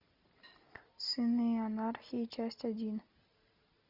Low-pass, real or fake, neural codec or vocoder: 5.4 kHz; real; none